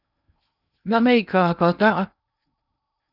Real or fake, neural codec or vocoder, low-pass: fake; codec, 16 kHz in and 24 kHz out, 0.6 kbps, FocalCodec, streaming, 2048 codes; 5.4 kHz